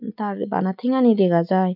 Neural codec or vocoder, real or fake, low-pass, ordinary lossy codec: none; real; 5.4 kHz; none